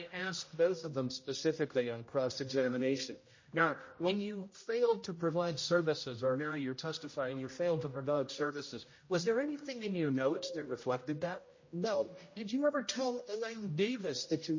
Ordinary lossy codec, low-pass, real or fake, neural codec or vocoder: MP3, 32 kbps; 7.2 kHz; fake; codec, 16 kHz, 0.5 kbps, X-Codec, HuBERT features, trained on general audio